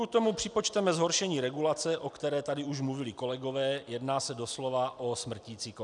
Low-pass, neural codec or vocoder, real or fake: 10.8 kHz; none; real